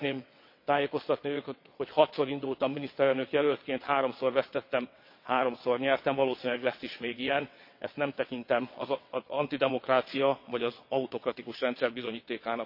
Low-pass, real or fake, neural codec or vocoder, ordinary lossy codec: 5.4 kHz; fake; vocoder, 22.05 kHz, 80 mel bands, WaveNeXt; MP3, 32 kbps